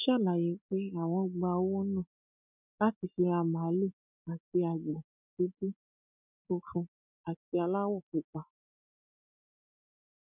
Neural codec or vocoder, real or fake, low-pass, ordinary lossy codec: none; real; 3.6 kHz; none